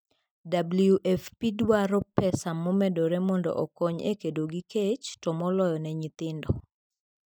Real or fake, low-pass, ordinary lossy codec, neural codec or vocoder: real; none; none; none